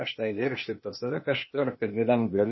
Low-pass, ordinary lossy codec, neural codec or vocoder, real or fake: 7.2 kHz; MP3, 24 kbps; codec, 16 kHz, 0.8 kbps, ZipCodec; fake